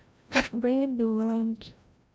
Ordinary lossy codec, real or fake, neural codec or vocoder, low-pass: none; fake; codec, 16 kHz, 0.5 kbps, FreqCodec, larger model; none